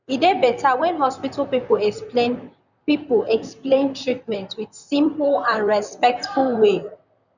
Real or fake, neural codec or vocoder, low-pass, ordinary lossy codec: fake; vocoder, 44.1 kHz, 128 mel bands, Pupu-Vocoder; 7.2 kHz; none